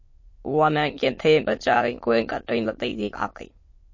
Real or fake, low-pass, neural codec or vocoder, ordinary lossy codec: fake; 7.2 kHz; autoencoder, 22.05 kHz, a latent of 192 numbers a frame, VITS, trained on many speakers; MP3, 32 kbps